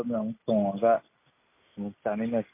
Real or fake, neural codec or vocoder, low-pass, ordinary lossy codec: real; none; 3.6 kHz; MP3, 24 kbps